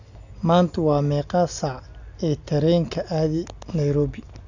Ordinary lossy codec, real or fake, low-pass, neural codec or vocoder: none; real; 7.2 kHz; none